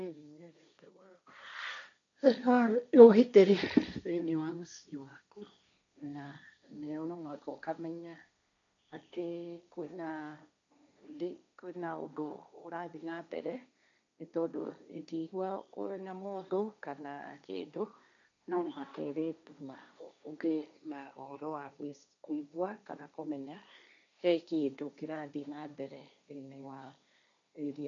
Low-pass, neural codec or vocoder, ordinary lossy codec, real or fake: 7.2 kHz; codec, 16 kHz, 1.1 kbps, Voila-Tokenizer; none; fake